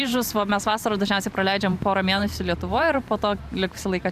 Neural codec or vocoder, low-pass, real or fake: vocoder, 44.1 kHz, 128 mel bands every 512 samples, BigVGAN v2; 14.4 kHz; fake